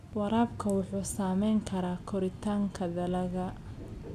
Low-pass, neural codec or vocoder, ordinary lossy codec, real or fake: 14.4 kHz; none; none; real